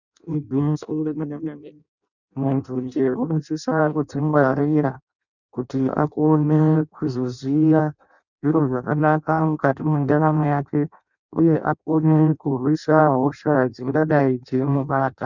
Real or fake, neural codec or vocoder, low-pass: fake; codec, 16 kHz in and 24 kHz out, 0.6 kbps, FireRedTTS-2 codec; 7.2 kHz